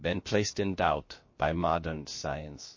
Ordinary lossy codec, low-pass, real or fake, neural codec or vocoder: MP3, 32 kbps; 7.2 kHz; fake; codec, 16 kHz, about 1 kbps, DyCAST, with the encoder's durations